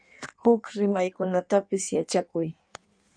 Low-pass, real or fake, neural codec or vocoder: 9.9 kHz; fake; codec, 16 kHz in and 24 kHz out, 1.1 kbps, FireRedTTS-2 codec